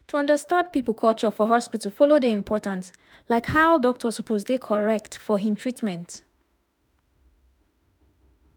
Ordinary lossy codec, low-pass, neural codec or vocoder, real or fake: none; none; autoencoder, 48 kHz, 32 numbers a frame, DAC-VAE, trained on Japanese speech; fake